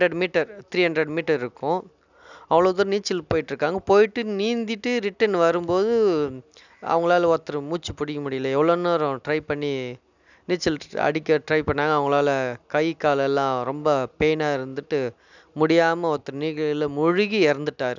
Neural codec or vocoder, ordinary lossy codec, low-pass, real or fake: none; none; 7.2 kHz; real